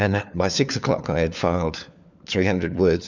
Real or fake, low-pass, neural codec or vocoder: fake; 7.2 kHz; codec, 16 kHz, 4 kbps, FunCodec, trained on LibriTTS, 50 frames a second